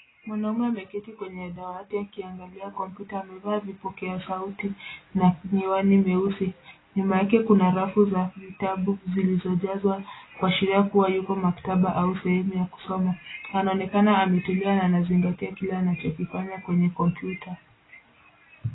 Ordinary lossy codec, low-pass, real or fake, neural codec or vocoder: AAC, 16 kbps; 7.2 kHz; real; none